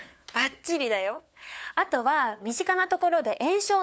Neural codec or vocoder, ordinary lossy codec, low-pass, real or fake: codec, 16 kHz, 4 kbps, FunCodec, trained on LibriTTS, 50 frames a second; none; none; fake